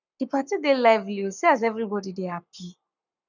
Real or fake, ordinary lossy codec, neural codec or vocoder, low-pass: fake; none; codec, 44.1 kHz, 7.8 kbps, Pupu-Codec; 7.2 kHz